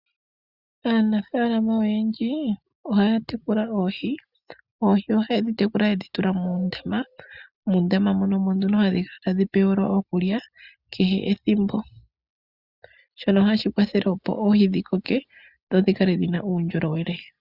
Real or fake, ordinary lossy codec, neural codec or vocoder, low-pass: real; Opus, 64 kbps; none; 5.4 kHz